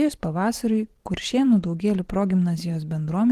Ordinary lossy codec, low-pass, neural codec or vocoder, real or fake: Opus, 16 kbps; 14.4 kHz; vocoder, 44.1 kHz, 128 mel bands every 512 samples, BigVGAN v2; fake